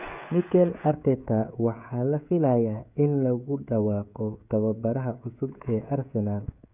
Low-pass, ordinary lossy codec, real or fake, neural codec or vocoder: 3.6 kHz; none; fake; codec, 16 kHz, 16 kbps, FreqCodec, smaller model